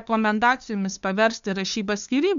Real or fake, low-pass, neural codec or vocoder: fake; 7.2 kHz; codec, 16 kHz, 2 kbps, FunCodec, trained on LibriTTS, 25 frames a second